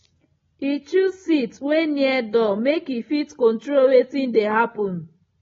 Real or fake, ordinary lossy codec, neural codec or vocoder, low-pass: real; AAC, 24 kbps; none; 7.2 kHz